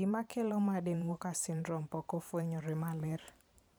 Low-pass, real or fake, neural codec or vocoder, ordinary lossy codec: none; fake; vocoder, 44.1 kHz, 128 mel bands every 512 samples, BigVGAN v2; none